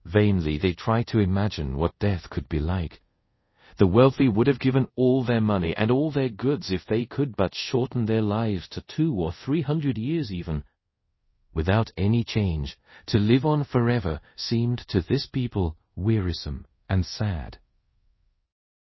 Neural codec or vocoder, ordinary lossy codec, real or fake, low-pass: codec, 24 kHz, 0.5 kbps, DualCodec; MP3, 24 kbps; fake; 7.2 kHz